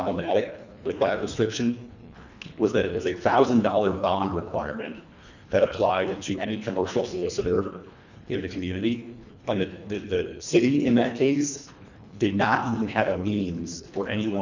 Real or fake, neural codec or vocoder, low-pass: fake; codec, 24 kHz, 1.5 kbps, HILCodec; 7.2 kHz